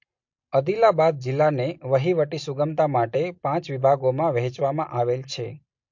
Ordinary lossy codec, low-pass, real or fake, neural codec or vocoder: MP3, 48 kbps; 7.2 kHz; real; none